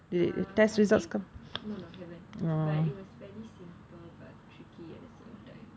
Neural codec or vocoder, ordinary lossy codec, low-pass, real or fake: none; none; none; real